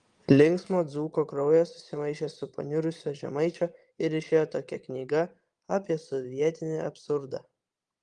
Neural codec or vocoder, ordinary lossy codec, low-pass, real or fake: vocoder, 22.05 kHz, 80 mel bands, Vocos; Opus, 24 kbps; 9.9 kHz; fake